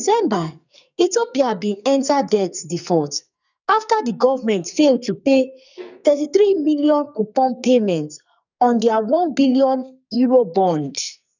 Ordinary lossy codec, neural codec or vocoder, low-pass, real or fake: none; codec, 44.1 kHz, 2.6 kbps, SNAC; 7.2 kHz; fake